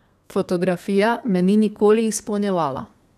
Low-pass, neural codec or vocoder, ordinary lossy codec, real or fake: 14.4 kHz; codec, 32 kHz, 1.9 kbps, SNAC; none; fake